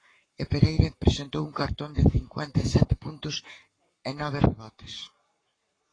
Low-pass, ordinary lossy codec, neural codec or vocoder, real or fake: 9.9 kHz; AAC, 32 kbps; codec, 44.1 kHz, 7.8 kbps, Pupu-Codec; fake